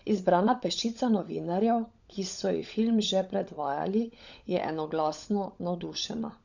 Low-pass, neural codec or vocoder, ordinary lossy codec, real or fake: 7.2 kHz; codec, 16 kHz, 16 kbps, FunCodec, trained on LibriTTS, 50 frames a second; none; fake